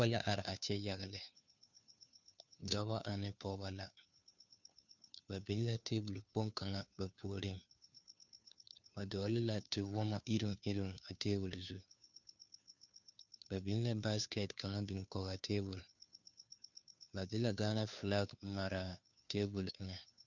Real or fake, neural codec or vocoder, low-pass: fake; codec, 16 kHz, 2 kbps, FunCodec, trained on Chinese and English, 25 frames a second; 7.2 kHz